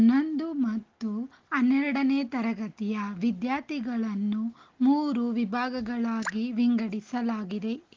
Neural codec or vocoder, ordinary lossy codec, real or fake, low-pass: none; Opus, 16 kbps; real; 7.2 kHz